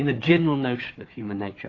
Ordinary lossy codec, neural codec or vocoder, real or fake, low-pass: AAC, 32 kbps; codec, 16 kHz, 4 kbps, FunCodec, trained on Chinese and English, 50 frames a second; fake; 7.2 kHz